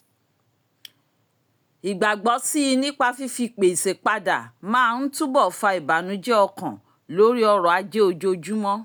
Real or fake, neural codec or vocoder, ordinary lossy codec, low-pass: real; none; none; none